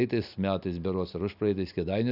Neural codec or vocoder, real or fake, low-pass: none; real; 5.4 kHz